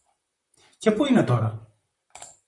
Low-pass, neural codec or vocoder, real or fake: 10.8 kHz; vocoder, 44.1 kHz, 128 mel bands, Pupu-Vocoder; fake